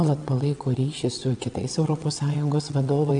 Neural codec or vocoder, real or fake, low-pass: vocoder, 22.05 kHz, 80 mel bands, WaveNeXt; fake; 9.9 kHz